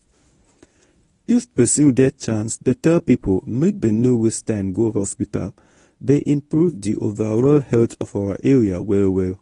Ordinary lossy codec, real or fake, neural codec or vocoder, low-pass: AAC, 32 kbps; fake; codec, 24 kHz, 0.9 kbps, WavTokenizer, medium speech release version 1; 10.8 kHz